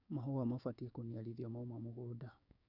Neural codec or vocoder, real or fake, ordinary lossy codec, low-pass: codec, 16 kHz, 6 kbps, DAC; fake; none; 5.4 kHz